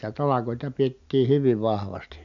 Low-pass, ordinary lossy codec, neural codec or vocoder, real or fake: 7.2 kHz; MP3, 64 kbps; none; real